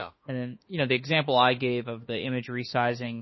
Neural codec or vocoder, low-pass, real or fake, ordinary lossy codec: autoencoder, 48 kHz, 32 numbers a frame, DAC-VAE, trained on Japanese speech; 7.2 kHz; fake; MP3, 24 kbps